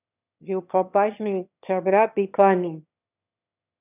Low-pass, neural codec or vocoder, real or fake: 3.6 kHz; autoencoder, 22.05 kHz, a latent of 192 numbers a frame, VITS, trained on one speaker; fake